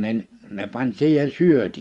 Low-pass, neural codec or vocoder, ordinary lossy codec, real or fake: 10.8 kHz; vocoder, 24 kHz, 100 mel bands, Vocos; Opus, 64 kbps; fake